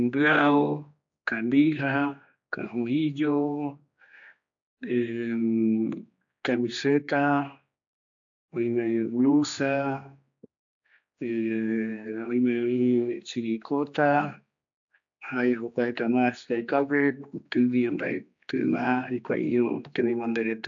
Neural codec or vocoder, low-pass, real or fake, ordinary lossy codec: codec, 16 kHz, 2 kbps, X-Codec, HuBERT features, trained on general audio; 7.2 kHz; fake; AAC, 64 kbps